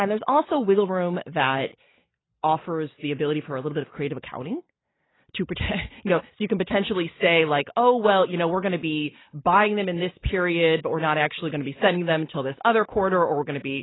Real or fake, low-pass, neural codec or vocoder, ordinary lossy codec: real; 7.2 kHz; none; AAC, 16 kbps